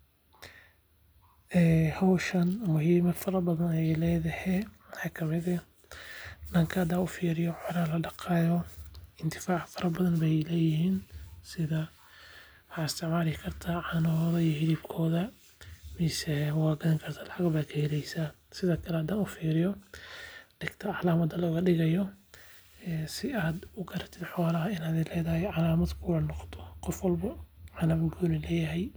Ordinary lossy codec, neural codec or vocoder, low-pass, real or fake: none; none; none; real